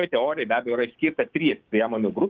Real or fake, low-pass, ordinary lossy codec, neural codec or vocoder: fake; 7.2 kHz; Opus, 32 kbps; codec, 16 kHz, 6 kbps, DAC